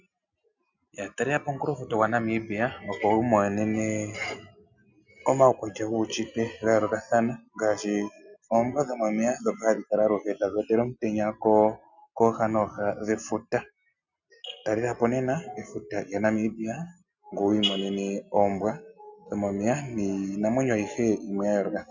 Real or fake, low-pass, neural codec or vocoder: real; 7.2 kHz; none